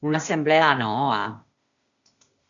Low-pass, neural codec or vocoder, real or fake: 7.2 kHz; codec, 16 kHz, 0.8 kbps, ZipCodec; fake